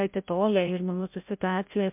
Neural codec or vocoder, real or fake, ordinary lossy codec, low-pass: codec, 16 kHz, 0.5 kbps, FreqCodec, larger model; fake; MP3, 32 kbps; 3.6 kHz